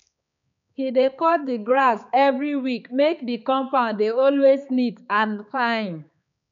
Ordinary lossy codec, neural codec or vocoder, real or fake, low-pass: none; codec, 16 kHz, 4 kbps, X-Codec, HuBERT features, trained on balanced general audio; fake; 7.2 kHz